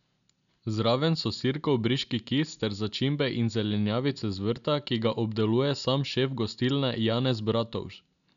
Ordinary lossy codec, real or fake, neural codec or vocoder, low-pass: none; real; none; 7.2 kHz